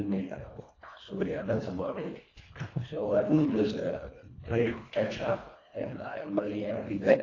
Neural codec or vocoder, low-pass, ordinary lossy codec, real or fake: codec, 24 kHz, 1.5 kbps, HILCodec; 7.2 kHz; AAC, 32 kbps; fake